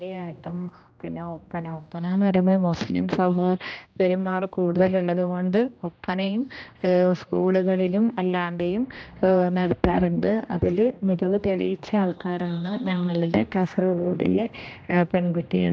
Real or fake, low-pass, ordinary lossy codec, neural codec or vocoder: fake; none; none; codec, 16 kHz, 1 kbps, X-Codec, HuBERT features, trained on general audio